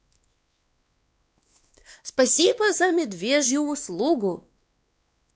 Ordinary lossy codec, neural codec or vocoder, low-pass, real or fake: none; codec, 16 kHz, 2 kbps, X-Codec, WavLM features, trained on Multilingual LibriSpeech; none; fake